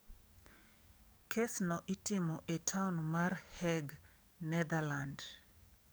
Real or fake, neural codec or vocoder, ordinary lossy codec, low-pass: fake; codec, 44.1 kHz, 7.8 kbps, DAC; none; none